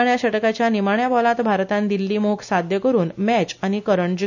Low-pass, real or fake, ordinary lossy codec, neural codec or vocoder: 7.2 kHz; real; none; none